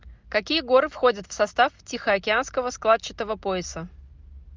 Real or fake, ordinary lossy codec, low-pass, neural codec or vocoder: real; Opus, 24 kbps; 7.2 kHz; none